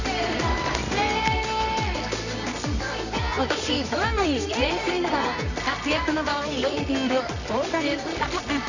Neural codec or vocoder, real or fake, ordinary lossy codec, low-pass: codec, 24 kHz, 0.9 kbps, WavTokenizer, medium music audio release; fake; none; 7.2 kHz